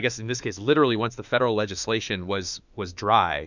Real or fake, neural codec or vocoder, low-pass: fake; autoencoder, 48 kHz, 32 numbers a frame, DAC-VAE, trained on Japanese speech; 7.2 kHz